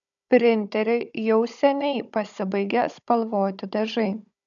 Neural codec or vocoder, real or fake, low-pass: codec, 16 kHz, 16 kbps, FunCodec, trained on Chinese and English, 50 frames a second; fake; 7.2 kHz